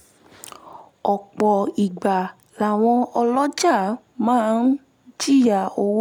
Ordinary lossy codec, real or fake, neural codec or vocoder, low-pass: none; real; none; 19.8 kHz